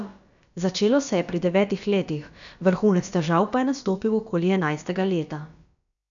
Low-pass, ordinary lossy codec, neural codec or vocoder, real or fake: 7.2 kHz; none; codec, 16 kHz, about 1 kbps, DyCAST, with the encoder's durations; fake